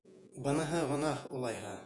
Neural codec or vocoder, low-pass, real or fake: vocoder, 48 kHz, 128 mel bands, Vocos; 10.8 kHz; fake